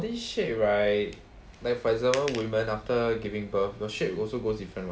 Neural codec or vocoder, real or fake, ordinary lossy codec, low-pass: none; real; none; none